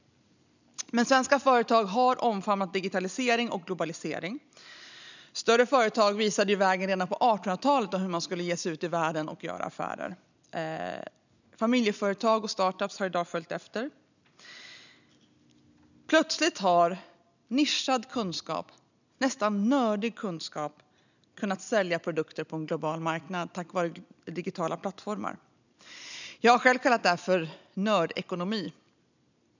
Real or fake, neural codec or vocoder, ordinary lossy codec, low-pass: real; none; none; 7.2 kHz